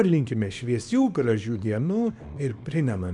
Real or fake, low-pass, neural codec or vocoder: fake; 10.8 kHz; codec, 24 kHz, 0.9 kbps, WavTokenizer, small release